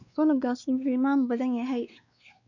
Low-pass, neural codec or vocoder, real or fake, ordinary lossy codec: 7.2 kHz; codec, 16 kHz, 2 kbps, X-Codec, WavLM features, trained on Multilingual LibriSpeech; fake; none